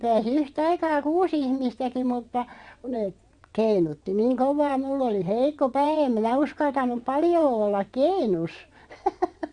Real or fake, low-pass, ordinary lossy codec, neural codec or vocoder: fake; 9.9 kHz; Opus, 64 kbps; vocoder, 22.05 kHz, 80 mel bands, WaveNeXt